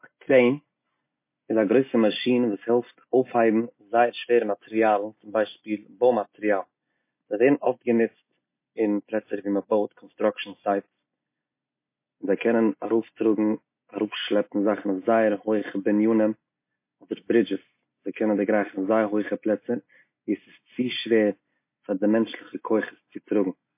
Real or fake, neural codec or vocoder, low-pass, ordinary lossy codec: real; none; 3.6 kHz; MP3, 24 kbps